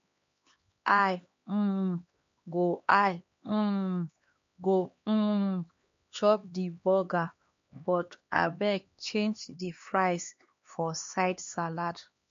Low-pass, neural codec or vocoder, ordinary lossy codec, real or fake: 7.2 kHz; codec, 16 kHz, 2 kbps, X-Codec, HuBERT features, trained on LibriSpeech; MP3, 48 kbps; fake